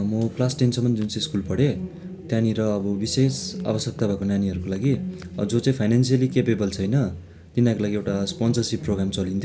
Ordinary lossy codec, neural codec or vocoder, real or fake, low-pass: none; none; real; none